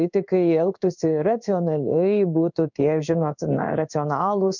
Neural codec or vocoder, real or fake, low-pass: codec, 16 kHz in and 24 kHz out, 1 kbps, XY-Tokenizer; fake; 7.2 kHz